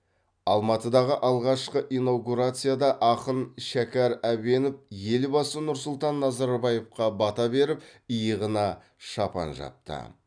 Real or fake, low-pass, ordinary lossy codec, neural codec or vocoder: real; 9.9 kHz; none; none